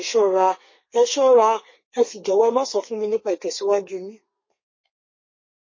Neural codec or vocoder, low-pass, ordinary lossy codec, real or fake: codec, 32 kHz, 1.9 kbps, SNAC; 7.2 kHz; MP3, 32 kbps; fake